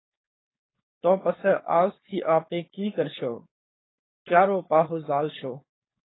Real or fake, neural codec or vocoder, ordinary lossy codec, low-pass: fake; codec, 16 kHz, 4.8 kbps, FACodec; AAC, 16 kbps; 7.2 kHz